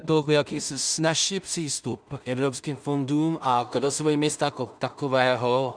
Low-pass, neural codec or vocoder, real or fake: 9.9 kHz; codec, 16 kHz in and 24 kHz out, 0.4 kbps, LongCat-Audio-Codec, two codebook decoder; fake